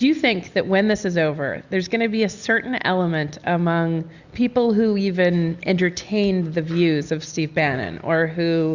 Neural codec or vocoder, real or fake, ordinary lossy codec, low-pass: none; real; Opus, 64 kbps; 7.2 kHz